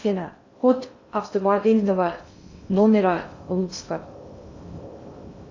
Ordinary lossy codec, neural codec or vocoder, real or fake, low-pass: AAC, 48 kbps; codec, 16 kHz in and 24 kHz out, 0.6 kbps, FocalCodec, streaming, 2048 codes; fake; 7.2 kHz